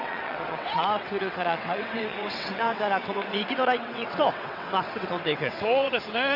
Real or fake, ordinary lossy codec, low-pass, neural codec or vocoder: fake; none; 5.4 kHz; vocoder, 22.05 kHz, 80 mel bands, WaveNeXt